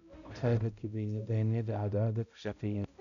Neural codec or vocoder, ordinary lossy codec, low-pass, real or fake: codec, 16 kHz, 0.5 kbps, X-Codec, HuBERT features, trained on balanced general audio; none; 7.2 kHz; fake